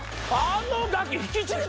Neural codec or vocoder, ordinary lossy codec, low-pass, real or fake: none; none; none; real